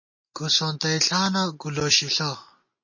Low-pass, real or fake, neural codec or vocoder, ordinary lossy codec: 7.2 kHz; real; none; MP3, 32 kbps